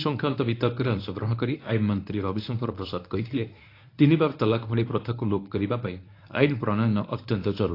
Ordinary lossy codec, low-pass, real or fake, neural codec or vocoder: AAC, 32 kbps; 5.4 kHz; fake; codec, 24 kHz, 0.9 kbps, WavTokenizer, medium speech release version 1